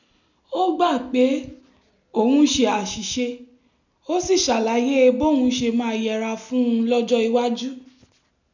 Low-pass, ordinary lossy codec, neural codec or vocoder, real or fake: 7.2 kHz; none; none; real